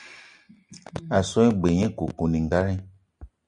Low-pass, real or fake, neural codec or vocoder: 9.9 kHz; real; none